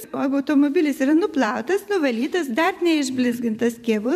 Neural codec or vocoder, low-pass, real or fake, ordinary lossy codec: vocoder, 44.1 kHz, 128 mel bands every 512 samples, BigVGAN v2; 14.4 kHz; fake; MP3, 96 kbps